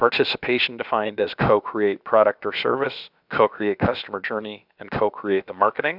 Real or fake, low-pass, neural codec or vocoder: fake; 5.4 kHz; codec, 16 kHz, about 1 kbps, DyCAST, with the encoder's durations